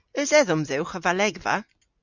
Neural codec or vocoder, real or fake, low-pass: none; real; 7.2 kHz